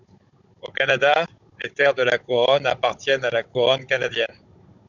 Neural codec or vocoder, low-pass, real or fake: codec, 16 kHz, 16 kbps, FunCodec, trained on Chinese and English, 50 frames a second; 7.2 kHz; fake